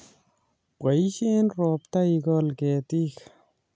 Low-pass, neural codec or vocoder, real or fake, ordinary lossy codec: none; none; real; none